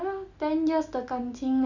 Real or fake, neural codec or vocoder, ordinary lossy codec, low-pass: real; none; none; 7.2 kHz